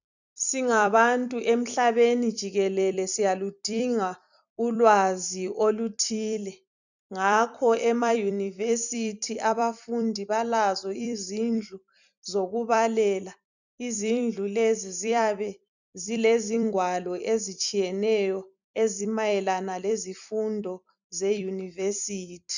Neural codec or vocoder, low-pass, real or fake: vocoder, 44.1 kHz, 128 mel bands every 256 samples, BigVGAN v2; 7.2 kHz; fake